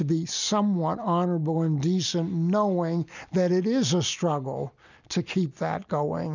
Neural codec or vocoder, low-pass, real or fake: none; 7.2 kHz; real